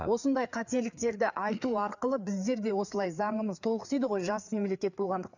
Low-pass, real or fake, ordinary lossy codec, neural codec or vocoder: 7.2 kHz; fake; none; codec, 16 kHz, 4 kbps, FreqCodec, larger model